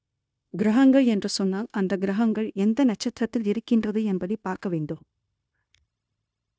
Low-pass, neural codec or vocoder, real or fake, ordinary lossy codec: none; codec, 16 kHz, 0.9 kbps, LongCat-Audio-Codec; fake; none